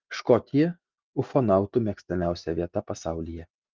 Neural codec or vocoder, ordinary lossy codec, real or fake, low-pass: none; Opus, 32 kbps; real; 7.2 kHz